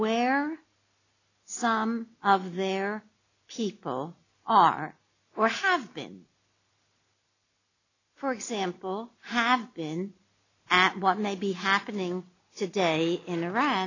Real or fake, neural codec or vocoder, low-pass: real; none; 7.2 kHz